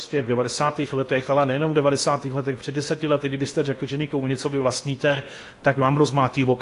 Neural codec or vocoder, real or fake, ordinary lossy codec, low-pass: codec, 16 kHz in and 24 kHz out, 0.8 kbps, FocalCodec, streaming, 65536 codes; fake; AAC, 48 kbps; 10.8 kHz